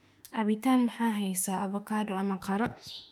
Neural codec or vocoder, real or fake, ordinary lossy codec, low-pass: autoencoder, 48 kHz, 32 numbers a frame, DAC-VAE, trained on Japanese speech; fake; none; 19.8 kHz